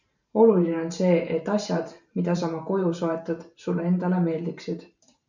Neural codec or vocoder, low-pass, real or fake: vocoder, 44.1 kHz, 128 mel bands every 512 samples, BigVGAN v2; 7.2 kHz; fake